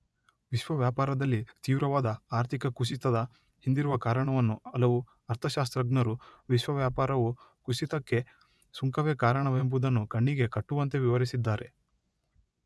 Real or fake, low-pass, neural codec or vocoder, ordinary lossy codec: fake; none; vocoder, 24 kHz, 100 mel bands, Vocos; none